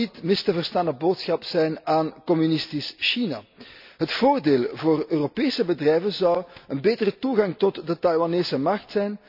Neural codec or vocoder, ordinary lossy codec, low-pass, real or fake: none; none; 5.4 kHz; real